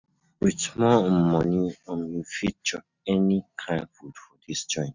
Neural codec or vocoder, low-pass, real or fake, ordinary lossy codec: none; 7.2 kHz; real; none